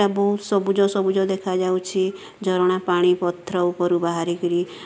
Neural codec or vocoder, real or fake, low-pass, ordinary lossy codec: none; real; none; none